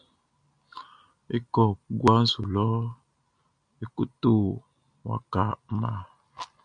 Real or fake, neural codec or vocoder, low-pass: real; none; 9.9 kHz